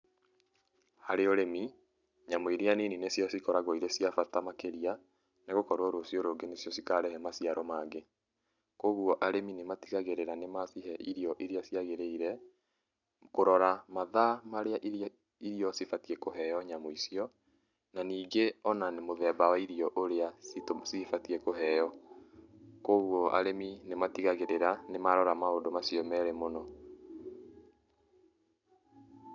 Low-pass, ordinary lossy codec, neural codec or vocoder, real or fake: 7.2 kHz; none; none; real